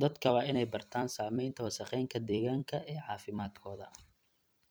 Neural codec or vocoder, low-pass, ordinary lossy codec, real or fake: vocoder, 44.1 kHz, 128 mel bands every 256 samples, BigVGAN v2; none; none; fake